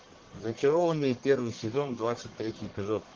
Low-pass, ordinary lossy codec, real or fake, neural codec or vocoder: 7.2 kHz; Opus, 16 kbps; fake; codec, 44.1 kHz, 1.7 kbps, Pupu-Codec